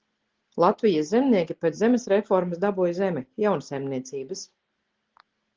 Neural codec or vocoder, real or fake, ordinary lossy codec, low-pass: none; real; Opus, 16 kbps; 7.2 kHz